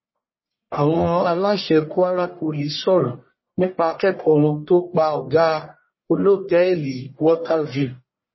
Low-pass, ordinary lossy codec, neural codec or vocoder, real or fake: 7.2 kHz; MP3, 24 kbps; codec, 44.1 kHz, 1.7 kbps, Pupu-Codec; fake